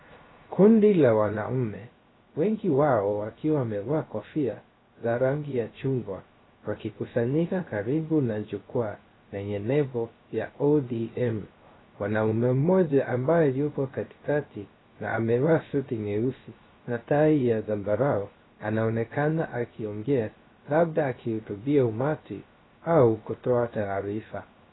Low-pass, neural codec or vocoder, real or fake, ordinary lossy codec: 7.2 kHz; codec, 16 kHz, 0.3 kbps, FocalCodec; fake; AAC, 16 kbps